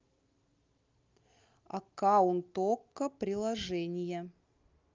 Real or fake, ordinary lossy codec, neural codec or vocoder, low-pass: real; Opus, 24 kbps; none; 7.2 kHz